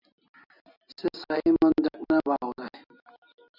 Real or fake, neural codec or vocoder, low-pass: real; none; 5.4 kHz